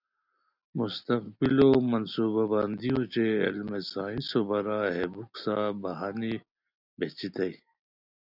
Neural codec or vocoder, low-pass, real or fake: none; 5.4 kHz; real